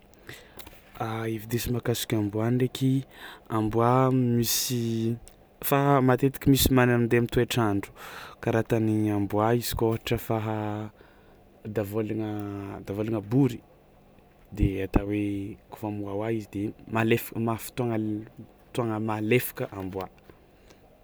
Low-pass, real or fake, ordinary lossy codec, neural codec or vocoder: none; real; none; none